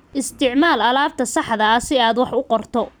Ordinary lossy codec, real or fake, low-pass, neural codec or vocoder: none; fake; none; vocoder, 44.1 kHz, 128 mel bands every 256 samples, BigVGAN v2